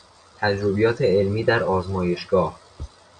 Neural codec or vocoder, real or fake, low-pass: none; real; 9.9 kHz